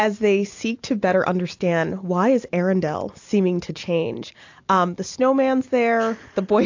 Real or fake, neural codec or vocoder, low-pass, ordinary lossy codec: real; none; 7.2 kHz; AAC, 48 kbps